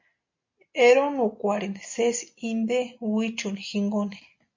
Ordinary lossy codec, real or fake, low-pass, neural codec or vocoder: MP3, 48 kbps; real; 7.2 kHz; none